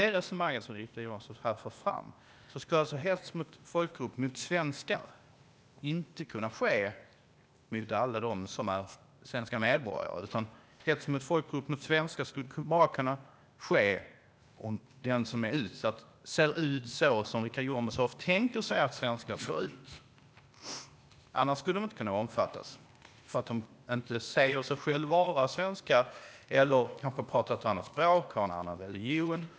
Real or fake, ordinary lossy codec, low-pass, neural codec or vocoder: fake; none; none; codec, 16 kHz, 0.8 kbps, ZipCodec